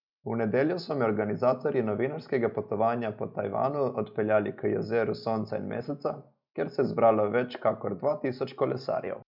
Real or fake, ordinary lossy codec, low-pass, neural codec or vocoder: real; none; 5.4 kHz; none